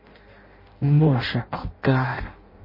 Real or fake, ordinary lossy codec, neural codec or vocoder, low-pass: fake; MP3, 24 kbps; codec, 16 kHz in and 24 kHz out, 0.6 kbps, FireRedTTS-2 codec; 5.4 kHz